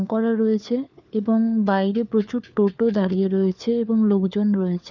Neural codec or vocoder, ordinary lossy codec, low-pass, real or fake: codec, 16 kHz, 4 kbps, FunCodec, trained on LibriTTS, 50 frames a second; none; 7.2 kHz; fake